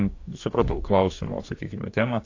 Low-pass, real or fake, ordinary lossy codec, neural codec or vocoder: 7.2 kHz; fake; AAC, 48 kbps; codec, 44.1 kHz, 2.6 kbps, DAC